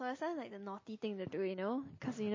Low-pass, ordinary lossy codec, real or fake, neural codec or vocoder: 7.2 kHz; MP3, 32 kbps; real; none